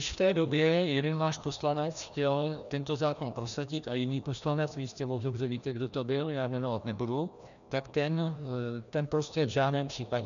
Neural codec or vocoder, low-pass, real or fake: codec, 16 kHz, 1 kbps, FreqCodec, larger model; 7.2 kHz; fake